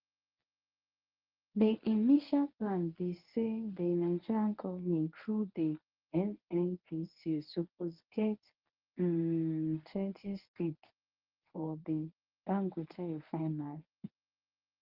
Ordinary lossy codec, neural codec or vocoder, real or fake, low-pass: Opus, 32 kbps; codec, 24 kHz, 0.9 kbps, WavTokenizer, medium speech release version 1; fake; 5.4 kHz